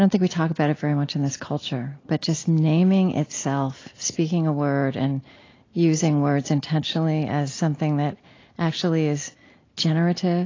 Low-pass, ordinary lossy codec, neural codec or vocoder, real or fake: 7.2 kHz; AAC, 32 kbps; none; real